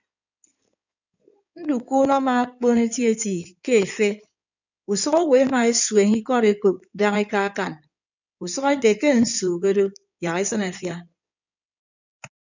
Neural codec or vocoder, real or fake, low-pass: codec, 16 kHz in and 24 kHz out, 2.2 kbps, FireRedTTS-2 codec; fake; 7.2 kHz